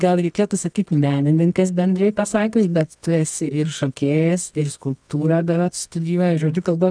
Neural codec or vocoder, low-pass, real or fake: codec, 24 kHz, 0.9 kbps, WavTokenizer, medium music audio release; 9.9 kHz; fake